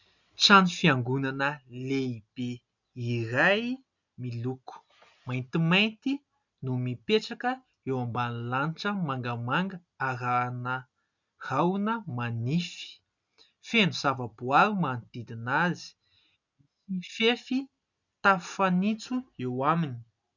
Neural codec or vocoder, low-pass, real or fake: none; 7.2 kHz; real